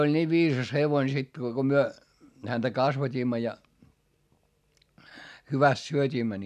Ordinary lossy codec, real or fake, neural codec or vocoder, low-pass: none; real; none; 14.4 kHz